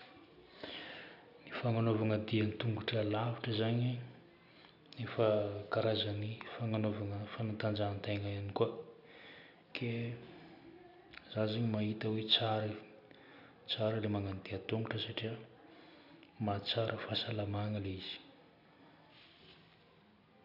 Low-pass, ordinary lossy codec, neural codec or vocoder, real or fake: 5.4 kHz; none; none; real